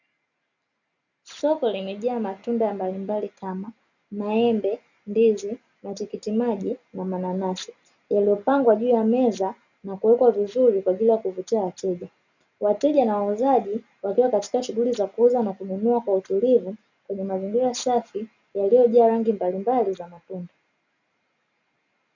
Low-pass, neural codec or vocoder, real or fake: 7.2 kHz; none; real